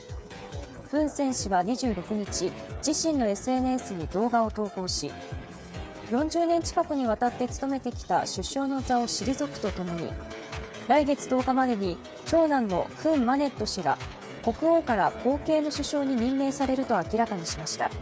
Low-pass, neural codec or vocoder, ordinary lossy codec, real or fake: none; codec, 16 kHz, 8 kbps, FreqCodec, smaller model; none; fake